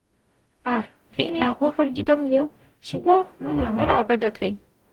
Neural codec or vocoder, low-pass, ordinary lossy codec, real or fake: codec, 44.1 kHz, 0.9 kbps, DAC; 19.8 kHz; Opus, 24 kbps; fake